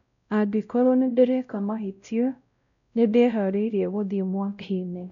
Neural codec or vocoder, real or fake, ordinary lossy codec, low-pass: codec, 16 kHz, 0.5 kbps, X-Codec, WavLM features, trained on Multilingual LibriSpeech; fake; none; 7.2 kHz